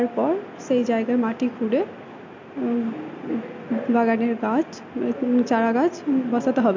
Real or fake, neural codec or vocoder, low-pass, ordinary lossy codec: real; none; 7.2 kHz; MP3, 64 kbps